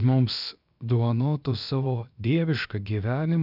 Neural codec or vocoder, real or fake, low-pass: codec, 16 kHz, about 1 kbps, DyCAST, with the encoder's durations; fake; 5.4 kHz